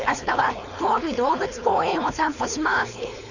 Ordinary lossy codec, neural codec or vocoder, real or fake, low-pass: none; codec, 16 kHz, 4.8 kbps, FACodec; fake; 7.2 kHz